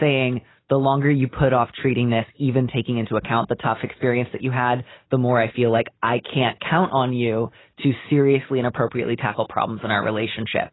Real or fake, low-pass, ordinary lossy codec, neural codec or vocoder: real; 7.2 kHz; AAC, 16 kbps; none